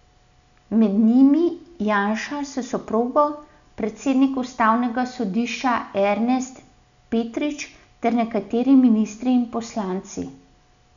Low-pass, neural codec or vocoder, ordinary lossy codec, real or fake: 7.2 kHz; none; none; real